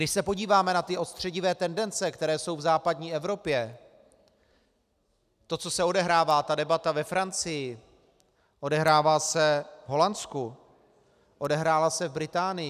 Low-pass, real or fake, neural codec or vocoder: 14.4 kHz; real; none